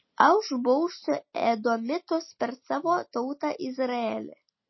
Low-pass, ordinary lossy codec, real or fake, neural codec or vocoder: 7.2 kHz; MP3, 24 kbps; real; none